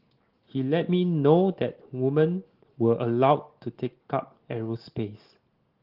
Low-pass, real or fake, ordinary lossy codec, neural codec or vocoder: 5.4 kHz; real; Opus, 16 kbps; none